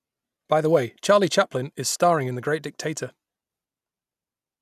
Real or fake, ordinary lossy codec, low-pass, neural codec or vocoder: real; none; 14.4 kHz; none